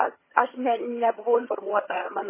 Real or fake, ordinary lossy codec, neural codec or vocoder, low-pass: fake; MP3, 16 kbps; vocoder, 22.05 kHz, 80 mel bands, HiFi-GAN; 3.6 kHz